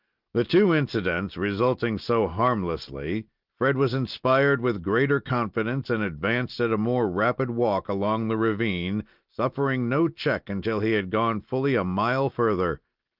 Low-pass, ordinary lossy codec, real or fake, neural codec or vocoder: 5.4 kHz; Opus, 32 kbps; real; none